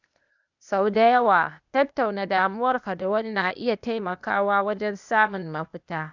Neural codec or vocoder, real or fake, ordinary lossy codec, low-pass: codec, 16 kHz, 0.8 kbps, ZipCodec; fake; none; 7.2 kHz